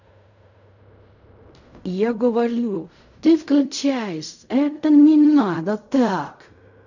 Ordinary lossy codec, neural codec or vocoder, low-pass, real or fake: none; codec, 16 kHz in and 24 kHz out, 0.4 kbps, LongCat-Audio-Codec, fine tuned four codebook decoder; 7.2 kHz; fake